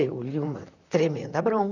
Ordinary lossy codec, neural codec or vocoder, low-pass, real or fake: none; vocoder, 44.1 kHz, 128 mel bands, Pupu-Vocoder; 7.2 kHz; fake